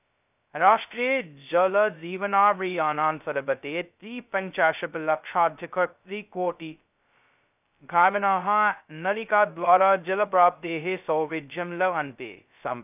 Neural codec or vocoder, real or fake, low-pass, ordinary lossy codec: codec, 16 kHz, 0.2 kbps, FocalCodec; fake; 3.6 kHz; none